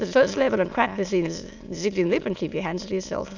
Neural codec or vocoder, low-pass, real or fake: autoencoder, 22.05 kHz, a latent of 192 numbers a frame, VITS, trained on many speakers; 7.2 kHz; fake